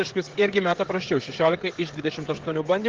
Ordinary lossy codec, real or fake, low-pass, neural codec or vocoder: Opus, 16 kbps; fake; 7.2 kHz; codec, 16 kHz, 16 kbps, FreqCodec, smaller model